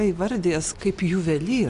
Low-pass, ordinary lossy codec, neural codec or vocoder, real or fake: 10.8 kHz; MP3, 96 kbps; none; real